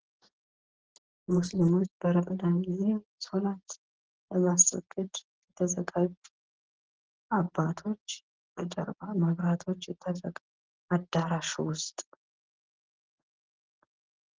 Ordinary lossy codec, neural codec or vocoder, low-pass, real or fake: Opus, 16 kbps; none; 7.2 kHz; real